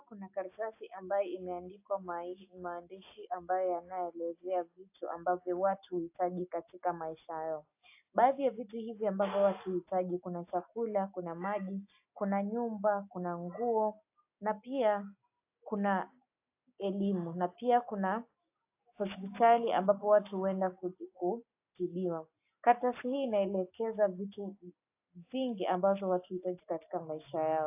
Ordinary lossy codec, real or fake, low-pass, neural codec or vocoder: AAC, 32 kbps; real; 3.6 kHz; none